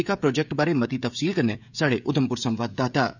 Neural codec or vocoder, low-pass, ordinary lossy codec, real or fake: codec, 16 kHz, 16 kbps, FreqCodec, smaller model; 7.2 kHz; none; fake